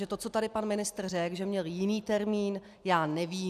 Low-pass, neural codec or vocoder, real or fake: 14.4 kHz; none; real